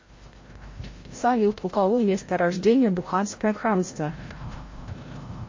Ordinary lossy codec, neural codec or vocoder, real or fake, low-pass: MP3, 32 kbps; codec, 16 kHz, 0.5 kbps, FreqCodec, larger model; fake; 7.2 kHz